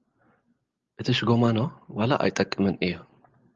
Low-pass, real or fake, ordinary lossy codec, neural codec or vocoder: 7.2 kHz; real; Opus, 32 kbps; none